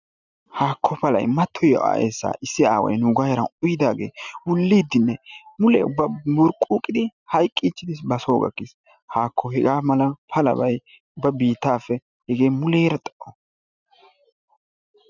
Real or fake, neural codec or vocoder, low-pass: real; none; 7.2 kHz